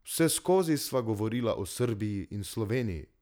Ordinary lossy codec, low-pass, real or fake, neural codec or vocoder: none; none; real; none